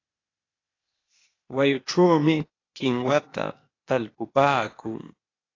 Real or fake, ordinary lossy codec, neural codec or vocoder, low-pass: fake; AAC, 32 kbps; codec, 16 kHz, 0.8 kbps, ZipCodec; 7.2 kHz